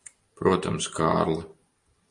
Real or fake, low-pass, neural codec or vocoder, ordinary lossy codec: real; 10.8 kHz; none; MP3, 48 kbps